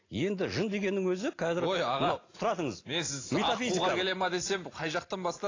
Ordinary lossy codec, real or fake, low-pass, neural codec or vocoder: AAC, 32 kbps; real; 7.2 kHz; none